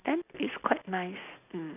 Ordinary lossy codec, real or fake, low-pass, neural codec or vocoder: none; real; 3.6 kHz; none